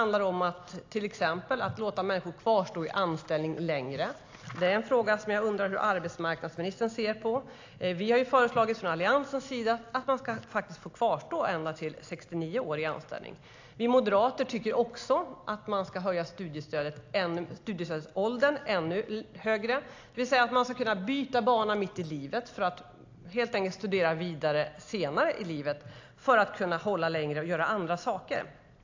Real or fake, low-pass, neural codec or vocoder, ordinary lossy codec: real; 7.2 kHz; none; AAC, 48 kbps